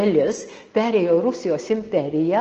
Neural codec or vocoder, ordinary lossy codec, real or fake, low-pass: none; Opus, 16 kbps; real; 7.2 kHz